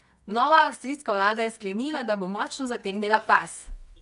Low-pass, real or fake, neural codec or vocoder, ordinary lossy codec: 10.8 kHz; fake; codec, 24 kHz, 0.9 kbps, WavTokenizer, medium music audio release; none